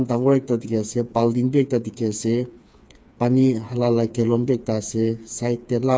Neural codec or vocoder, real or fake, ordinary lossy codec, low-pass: codec, 16 kHz, 4 kbps, FreqCodec, smaller model; fake; none; none